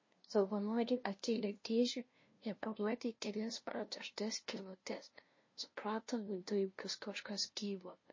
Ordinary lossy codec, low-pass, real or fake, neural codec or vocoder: MP3, 32 kbps; 7.2 kHz; fake; codec, 16 kHz, 0.5 kbps, FunCodec, trained on LibriTTS, 25 frames a second